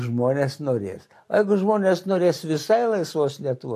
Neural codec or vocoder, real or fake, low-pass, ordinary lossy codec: none; real; 14.4 kHz; AAC, 64 kbps